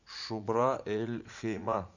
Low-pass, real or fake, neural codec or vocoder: 7.2 kHz; fake; vocoder, 44.1 kHz, 80 mel bands, Vocos